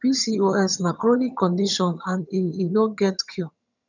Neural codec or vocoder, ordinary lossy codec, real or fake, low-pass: vocoder, 22.05 kHz, 80 mel bands, HiFi-GAN; none; fake; 7.2 kHz